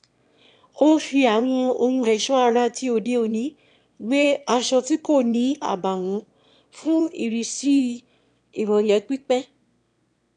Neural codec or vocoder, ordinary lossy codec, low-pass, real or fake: autoencoder, 22.05 kHz, a latent of 192 numbers a frame, VITS, trained on one speaker; none; 9.9 kHz; fake